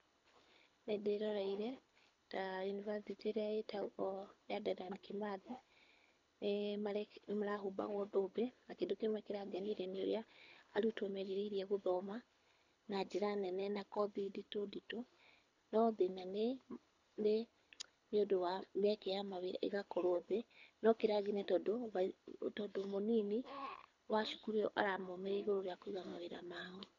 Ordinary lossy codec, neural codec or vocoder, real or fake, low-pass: none; codec, 24 kHz, 6 kbps, HILCodec; fake; 7.2 kHz